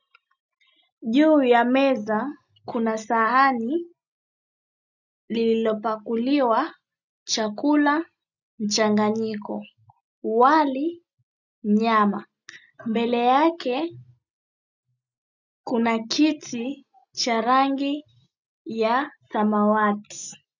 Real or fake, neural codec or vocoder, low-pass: real; none; 7.2 kHz